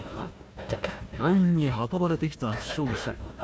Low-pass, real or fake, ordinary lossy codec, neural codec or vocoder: none; fake; none; codec, 16 kHz, 1 kbps, FunCodec, trained on Chinese and English, 50 frames a second